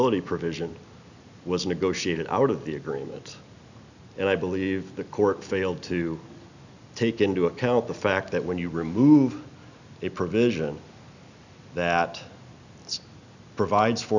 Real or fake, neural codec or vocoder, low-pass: real; none; 7.2 kHz